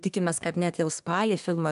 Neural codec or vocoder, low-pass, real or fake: codec, 24 kHz, 1 kbps, SNAC; 10.8 kHz; fake